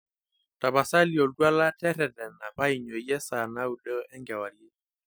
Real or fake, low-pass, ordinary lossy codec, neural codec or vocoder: real; none; none; none